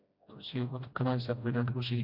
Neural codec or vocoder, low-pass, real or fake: codec, 16 kHz, 1 kbps, FreqCodec, smaller model; 5.4 kHz; fake